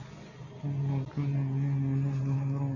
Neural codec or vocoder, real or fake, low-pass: none; real; 7.2 kHz